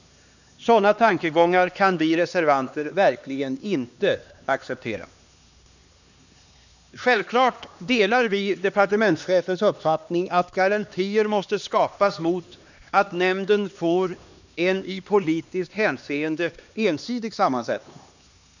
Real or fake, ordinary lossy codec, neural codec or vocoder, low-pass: fake; none; codec, 16 kHz, 2 kbps, X-Codec, HuBERT features, trained on LibriSpeech; 7.2 kHz